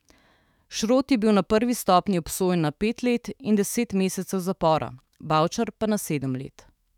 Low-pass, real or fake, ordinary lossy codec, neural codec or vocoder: 19.8 kHz; fake; none; autoencoder, 48 kHz, 128 numbers a frame, DAC-VAE, trained on Japanese speech